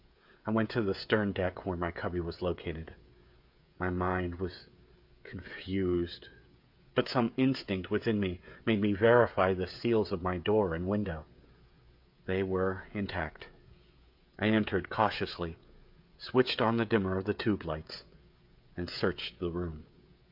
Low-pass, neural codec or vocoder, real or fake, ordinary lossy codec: 5.4 kHz; codec, 16 kHz, 16 kbps, FreqCodec, smaller model; fake; MP3, 48 kbps